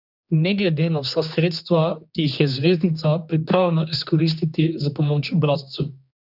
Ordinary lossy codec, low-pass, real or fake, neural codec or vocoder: none; 5.4 kHz; fake; codec, 16 kHz, 2 kbps, X-Codec, HuBERT features, trained on general audio